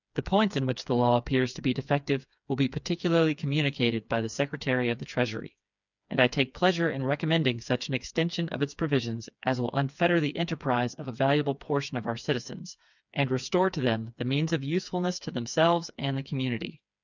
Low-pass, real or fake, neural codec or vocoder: 7.2 kHz; fake; codec, 16 kHz, 4 kbps, FreqCodec, smaller model